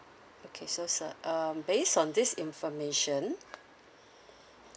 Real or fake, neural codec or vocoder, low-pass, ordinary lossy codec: real; none; none; none